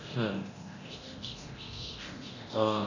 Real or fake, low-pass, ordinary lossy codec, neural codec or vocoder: fake; 7.2 kHz; none; codec, 24 kHz, 0.9 kbps, DualCodec